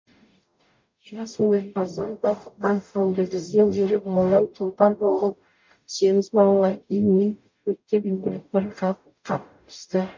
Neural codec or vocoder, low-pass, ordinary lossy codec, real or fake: codec, 44.1 kHz, 0.9 kbps, DAC; 7.2 kHz; MP3, 48 kbps; fake